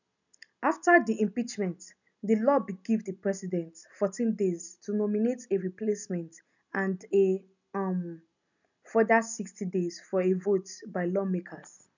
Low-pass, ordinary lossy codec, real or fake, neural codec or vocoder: 7.2 kHz; none; real; none